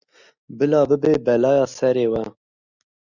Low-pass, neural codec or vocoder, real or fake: 7.2 kHz; none; real